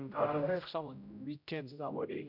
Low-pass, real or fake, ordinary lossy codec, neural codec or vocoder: 5.4 kHz; fake; none; codec, 16 kHz, 0.5 kbps, X-Codec, HuBERT features, trained on general audio